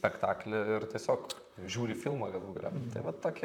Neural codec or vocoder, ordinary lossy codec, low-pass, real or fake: vocoder, 44.1 kHz, 128 mel bands, Pupu-Vocoder; MP3, 96 kbps; 19.8 kHz; fake